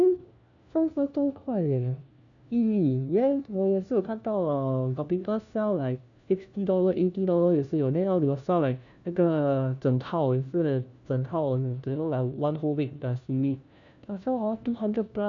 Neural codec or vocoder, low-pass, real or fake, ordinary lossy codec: codec, 16 kHz, 1 kbps, FunCodec, trained on LibriTTS, 50 frames a second; 7.2 kHz; fake; none